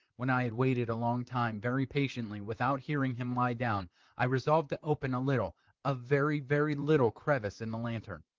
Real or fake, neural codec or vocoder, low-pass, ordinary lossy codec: fake; vocoder, 44.1 kHz, 128 mel bands, Pupu-Vocoder; 7.2 kHz; Opus, 32 kbps